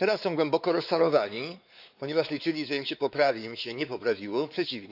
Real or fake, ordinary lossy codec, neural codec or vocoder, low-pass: fake; AAC, 48 kbps; codec, 16 kHz, 4 kbps, X-Codec, WavLM features, trained on Multilingual LibriSpeech; 5.4 kHz